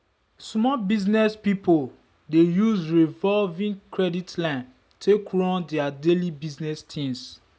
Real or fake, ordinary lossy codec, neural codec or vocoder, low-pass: real; none; none; none